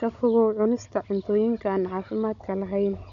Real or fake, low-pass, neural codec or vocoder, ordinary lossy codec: fake; 7.2 kHz; codec, 16 kHz, 16 kbps, FunCodec, trained on Chinese and English, 50 frames a second; none